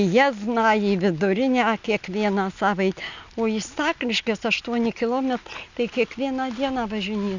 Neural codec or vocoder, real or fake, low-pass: none; real; 7.2 kHz